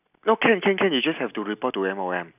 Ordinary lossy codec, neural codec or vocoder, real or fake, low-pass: none; none; real; 3.6 kHz